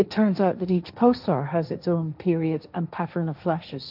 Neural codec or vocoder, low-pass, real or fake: codec, 16 kHz, 1.1 kbps, Voila-Tokenizer; 5.4 kHz; fake